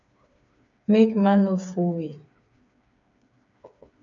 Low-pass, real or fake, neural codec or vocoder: 7.2 kHz; fake; codec, 16 kHz, 4 kbps, FreqCodec, smaller model